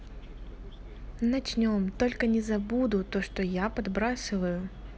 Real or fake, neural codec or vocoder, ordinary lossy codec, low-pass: real; none; none; none